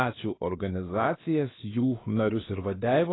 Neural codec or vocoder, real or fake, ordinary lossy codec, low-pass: codec, 16 kHz, about 1 kbps, DyCAST, with the encoder's durations; fake; AAC, 16 kbps; 7.2 kHz